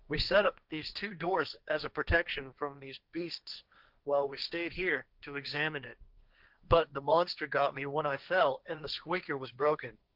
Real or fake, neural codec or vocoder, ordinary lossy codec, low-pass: fake; codec, 16 kHz, 1.1 kbps, Voila-Tokenizer; Opus, 24 kbps; 5.4 kHz